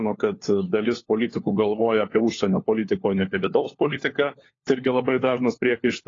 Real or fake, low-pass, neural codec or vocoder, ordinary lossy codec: fake; 7.2 kHz; codec, 16 kHz, 4 kbps, FunCodec, trained on LibriTTS, 50 frames a second; AAC, 32 kbps